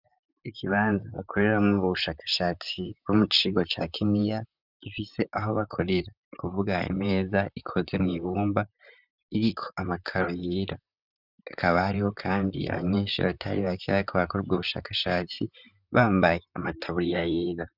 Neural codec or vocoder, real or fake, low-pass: vocoder, 44.1 kHz, 128 mel bands, Pupu-Vocoder; fake; 5.4 kHz